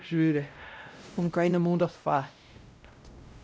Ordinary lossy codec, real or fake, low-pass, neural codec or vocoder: none; fake; none; codec, 16 kHz, 0.5 kbps, X-Codec, WavLM features, trained on Multilingual LibriSpeech